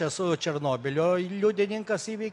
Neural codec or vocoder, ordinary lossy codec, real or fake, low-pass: none; MP3, 64 kbps; real; 10.8 kHz